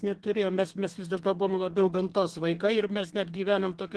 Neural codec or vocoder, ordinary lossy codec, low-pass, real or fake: codec, 32 kHz, 1.9 kbps, SNAC; Opus, 16 kbps; 10.8 kHz; fake